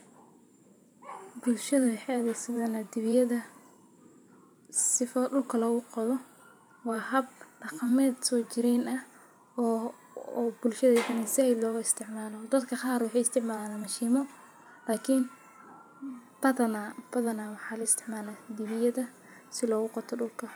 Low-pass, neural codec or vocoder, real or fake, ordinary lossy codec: none; vocoder, 44.1 kHz, 128 mel bands every 512 samples, BigVGAN v2; fake; none